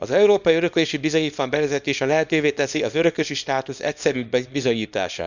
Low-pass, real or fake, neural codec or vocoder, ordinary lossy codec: 7.2 kHz; fake; codec, 24 kHz, 0.9 kbps, WavTokenizer, small release; none